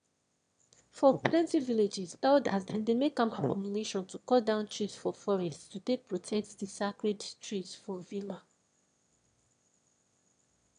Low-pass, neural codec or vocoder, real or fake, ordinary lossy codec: 9.9 kHz; autoencoder, 22.05 kHz, a latent of 192 numbers a frame, VITS, trained on one speaker; fake; none